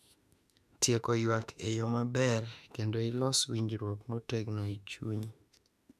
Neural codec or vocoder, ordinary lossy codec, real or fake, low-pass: autoencoder, 48 kHz, 32 numbers a frame, DAC-VAE, trained on Japanese speech; none; fake; 14.4 kHz